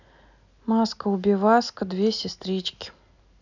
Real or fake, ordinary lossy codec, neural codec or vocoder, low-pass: real; none; none; 7.2 kHz